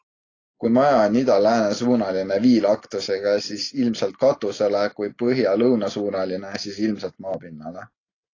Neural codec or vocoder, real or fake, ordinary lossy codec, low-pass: none; real; AAC, 32 kbps; 7.2 kHz